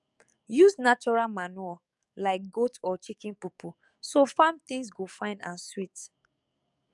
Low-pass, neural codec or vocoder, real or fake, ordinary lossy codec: 10.8 kHz; codec, 44.1 kHz, 7.8 kbps, DAC; fake; none